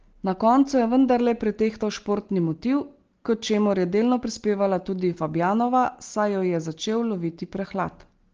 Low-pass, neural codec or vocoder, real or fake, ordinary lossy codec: 7.2 kHz; none; real; Opus, 16 kbps